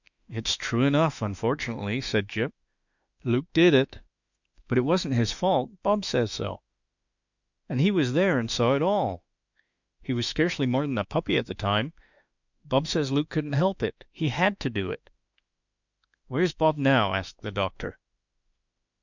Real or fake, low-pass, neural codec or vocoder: fake; 7.2 kHz; autoencoder, 48 kHz, 32 numbers a frame, DAC-VAE, trained on Japanese speech